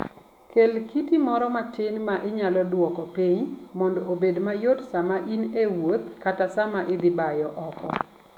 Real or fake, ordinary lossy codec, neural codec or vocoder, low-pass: fake; none; autoencoder, 48 kHz, 128 numbers a frame, DAC-VAE, trained on Japanese speech; 19.8 kHz